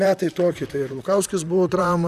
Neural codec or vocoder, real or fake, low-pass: vocoder, 44.1 kHz, 128 mel bands, Pupu-Vocoder; fake; 14.4 kHz